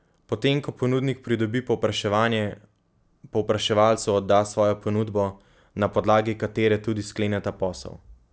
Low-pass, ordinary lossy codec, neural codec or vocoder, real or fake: none; none; none; real